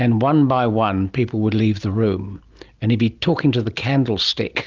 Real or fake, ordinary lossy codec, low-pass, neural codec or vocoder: real; Opus, 32 kbps; 7.2 kHz; none